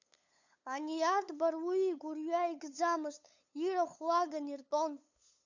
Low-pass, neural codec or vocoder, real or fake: 7.2 kHz; codec, 16 kHz, 8 kbps, FunCodec, trained on LibriTTS, 25 frames a second; fake